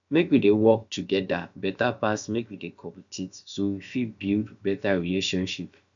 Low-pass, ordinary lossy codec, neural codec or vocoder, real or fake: 7.2 kHz; none; codec, 16 kHz, about 1 kbps, DyCAST, with the encoder's durations; fake